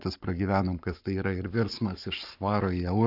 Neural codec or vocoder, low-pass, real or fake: codec, 44.1 kHz, 7.8 kbps, Pupu-Codec; 5.4 kHz; fake